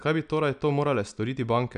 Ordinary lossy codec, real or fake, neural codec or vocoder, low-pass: none; real; none; 9.9 kHz